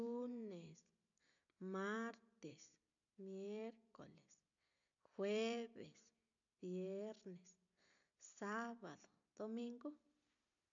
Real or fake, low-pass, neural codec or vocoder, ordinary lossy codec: real; 7.2 kHz; none; AAC, 64 kbps